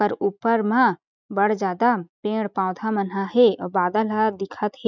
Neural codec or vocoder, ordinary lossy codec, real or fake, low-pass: none; none; real; 7.2 kHz